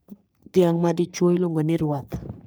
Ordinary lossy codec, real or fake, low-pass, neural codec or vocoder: none; fake; none; codec, 44.1 kHz, 3.4 kbps, Pupu-Codec